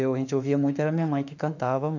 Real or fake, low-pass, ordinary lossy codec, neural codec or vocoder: fake; 7.2 kHz; none; autoencoder, 48 kHz, 32 numbers a frame, DAC-VAE, trained on Japanese speech